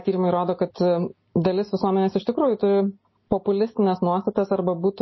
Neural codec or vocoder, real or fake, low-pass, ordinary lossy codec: none; real; 7.2 kHz; MP3, 24 kbps